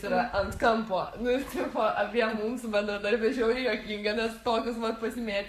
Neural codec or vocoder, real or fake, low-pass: codec, 44.1 kHz, 7.8 kbps, Pupu-Codec; fake; 14.4 kHz